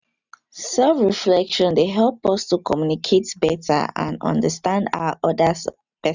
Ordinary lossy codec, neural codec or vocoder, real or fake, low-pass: none; none; real; 7.2 kHz